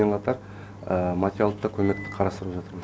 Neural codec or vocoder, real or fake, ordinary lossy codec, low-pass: none; real; none; none